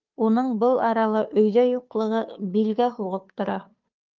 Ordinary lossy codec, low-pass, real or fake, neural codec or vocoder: Opus, 24 kbps; 7.2 kHz; fake; codec, 16 kHz, 2 kbps, FunCodec, trained on Chinese and English, 25 frames a second